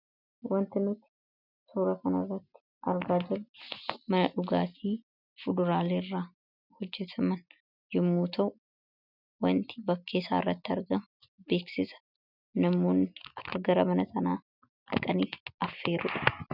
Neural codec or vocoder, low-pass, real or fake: none; 5.4 kHz; real